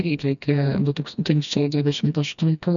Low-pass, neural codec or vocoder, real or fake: 7.2 kHz; codec, 16 kHz, 1 kbps, FreqCodec, smaller model; fake